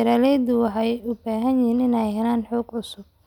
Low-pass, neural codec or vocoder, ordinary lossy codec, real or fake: 19.8 kHz; none; none; real